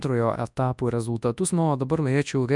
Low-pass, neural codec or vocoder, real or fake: 10.8 kHz; codec, 24 kHz, 0.9 kbps, WavTokenizer, large speech release; fake